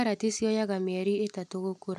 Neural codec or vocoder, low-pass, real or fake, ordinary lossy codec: none; 14.4 kHz; real; none